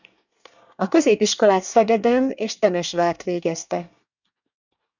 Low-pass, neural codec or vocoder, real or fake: 7.2 kHz; codec, 24 kHz, 1 kbps, SNAC; fake